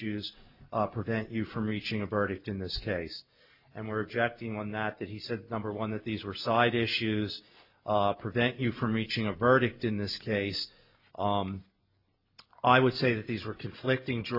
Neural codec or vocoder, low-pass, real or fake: none; 5.4 kHz; real